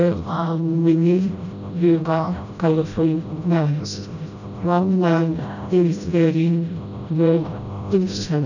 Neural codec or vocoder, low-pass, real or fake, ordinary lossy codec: codec, 16 kHz, 0.5 kbps, FreqCodec, smaller model; 7.2 kHz; fake; none